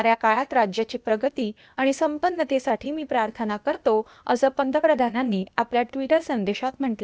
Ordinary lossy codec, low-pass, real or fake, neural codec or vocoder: none; none; fake; codec, 16 kHz, 0.8 kbps, ZipCodec